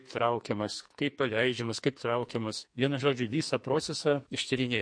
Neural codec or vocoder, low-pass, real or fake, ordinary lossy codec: codec, 44.1 kHz, 2.6 kbps, SNAC; 9.9 kHz; fake; MP3, 48 kbps